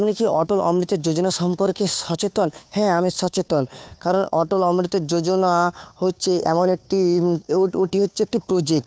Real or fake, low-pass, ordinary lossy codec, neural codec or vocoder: fake; none; none; codec, 16 kHz, 2 kbps, FunCodec, trained on Chinese and English, 25 frames a second